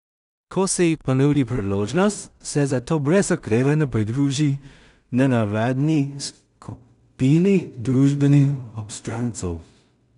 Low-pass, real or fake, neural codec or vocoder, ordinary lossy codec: 10.8 kHz; fake; codec, 16 kHz in and 24 kHz out, 0.4 kbps, LongCat-Audio-Codec, two codebook decoder; none